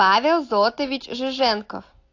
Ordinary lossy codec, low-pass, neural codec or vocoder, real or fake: AAC, 48 kbps; 7.2 kHz; none; real